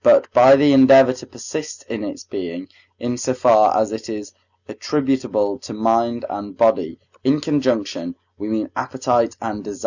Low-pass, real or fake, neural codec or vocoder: 7.2 kHz; real; none